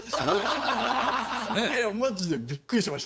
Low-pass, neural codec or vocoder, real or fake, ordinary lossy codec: none; codec, 16 kHz, 8 kbps, FunCodec, trained on LibriTTS, 25 frames a second; fake; none